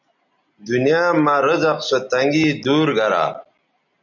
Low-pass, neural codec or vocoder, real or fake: 7.2 kHz; none; real